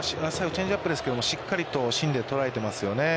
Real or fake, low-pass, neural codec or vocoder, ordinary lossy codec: real; none; none; none